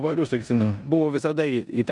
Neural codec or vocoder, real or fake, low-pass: codec, 16 kHz in and 24 kHz out, 0.9 kbps, LongCat-Audio-Codec, four codebook decoder; fake; 10.8 kHz